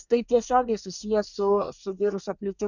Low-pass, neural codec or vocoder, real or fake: 7.2 kHz; codec, 24 kHz, 1 kbps, SNAC; fake